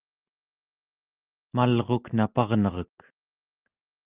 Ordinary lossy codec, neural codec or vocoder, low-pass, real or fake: Opus, 24 kbps; none; 3.6 kHz; real